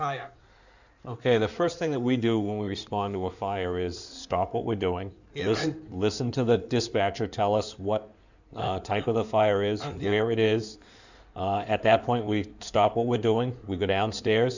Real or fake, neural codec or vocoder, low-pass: fake; codec, 16 kHz in and 24 kHz out, 2.2 kbps, FireRedTTS-2 codec; 7.2 kHz